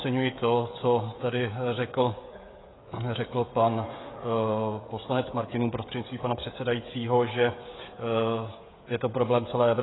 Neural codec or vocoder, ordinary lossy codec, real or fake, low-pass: codec, 16 kHz, 16 kbps, FreqCodec, smaller model; AAC, 16 kbps; fake; 7.2 kHz